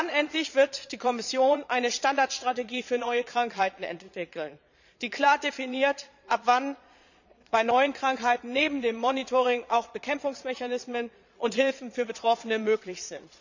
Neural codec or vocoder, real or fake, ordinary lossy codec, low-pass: vocoder, 22.05 kHz, 80 mel bands, Vocos; fake; none; 7.2 kHz